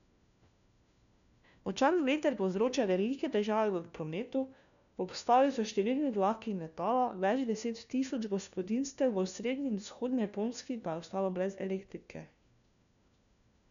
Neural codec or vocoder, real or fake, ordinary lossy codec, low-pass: codec, 16 kHz, 1 kbps, FunCodec, trained on LibriTTS, 50 frames a second; fake; none; 7.2 kHz